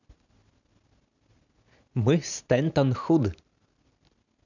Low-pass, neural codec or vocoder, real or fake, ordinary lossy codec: 7.2 kHz; none; real; none